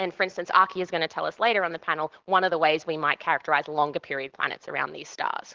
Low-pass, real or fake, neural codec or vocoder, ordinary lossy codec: 7.2 kHz; real; none; Opus, 16 kbps